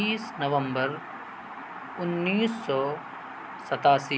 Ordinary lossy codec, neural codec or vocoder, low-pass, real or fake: none; none; none; real